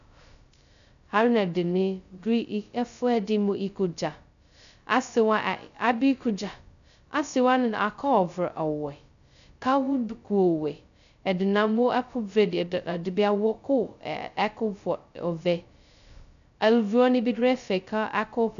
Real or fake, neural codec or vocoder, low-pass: fake; codec, 16 kHz, 0.2 kbps, FocalCodec; 7.2 kHz